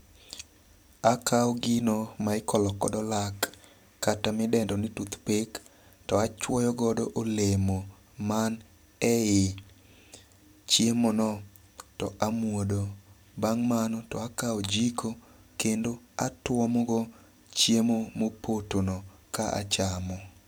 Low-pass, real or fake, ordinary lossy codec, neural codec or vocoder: none; fake; none; vocoder, 44.1 kHz, 128 mel bands every 256 samples, BigVGAN v2